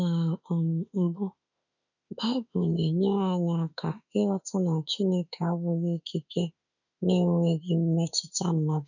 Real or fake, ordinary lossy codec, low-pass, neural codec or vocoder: fake; none; 7.2 kHz; autoencoder, 48 kHz, 32 numbers a frame, DAC-VAE, trained on Japanese speech